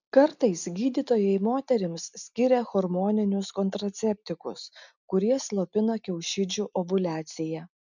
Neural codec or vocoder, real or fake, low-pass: none; real; 7.2 kHz